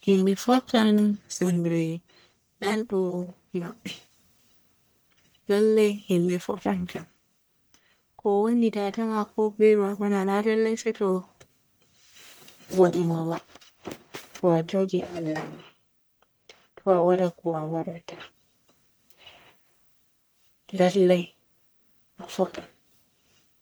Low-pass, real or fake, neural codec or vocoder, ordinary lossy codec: none; fake; codec, 44.1 kHz, 1.7 kbps, Pupu-Codec; none